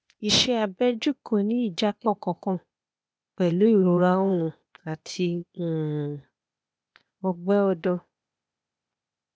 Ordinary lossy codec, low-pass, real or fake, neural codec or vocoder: none; none; fake; codec, 16 kHz, 0.8 kbps, ZipCodec